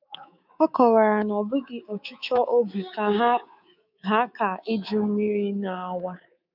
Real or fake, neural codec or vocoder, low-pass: fake; codec, 24 kHz, 3.1 kbps, DualCodec; 5.4 kHz